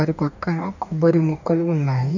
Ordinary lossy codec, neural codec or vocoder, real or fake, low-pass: none; codec, 44.1 kHz, 2.6 kbps, DAC; fake; 7.2 kHz